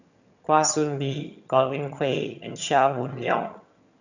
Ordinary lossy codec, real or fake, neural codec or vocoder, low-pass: none; fake; vocoder, 22.05 kHz, 80 mel bands, HiFi-GAN; 7.2 kHz